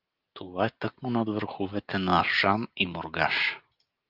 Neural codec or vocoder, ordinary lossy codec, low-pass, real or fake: none; Opus, 32 kbps; 5.4 kHz; real